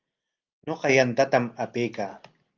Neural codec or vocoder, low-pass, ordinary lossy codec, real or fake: none; 7.2 kHz; Opus, 32 kbps; real